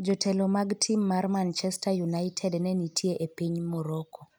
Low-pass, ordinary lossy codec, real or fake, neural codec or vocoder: none; none; real; none